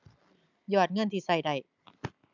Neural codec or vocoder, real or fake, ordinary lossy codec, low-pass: none; real; none; 7.2 kHz